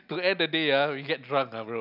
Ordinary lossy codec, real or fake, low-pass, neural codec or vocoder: none; real; 5.4 kHz; none